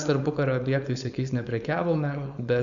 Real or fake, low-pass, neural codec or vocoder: fake; 7.2 kHz; codec, 16 kHz, 4.8 kbps, FACodec